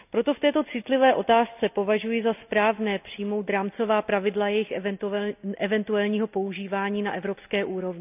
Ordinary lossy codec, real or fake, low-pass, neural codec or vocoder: none; real; 3.6 kHz; none